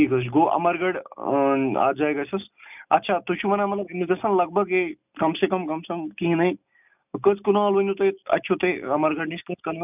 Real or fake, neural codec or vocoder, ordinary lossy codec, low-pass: real; none; none; 3.6 kHz